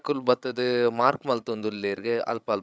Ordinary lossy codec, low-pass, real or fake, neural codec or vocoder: none; none; fake; codec, 16 kHz, 16 kbps, FreqCodec, larger model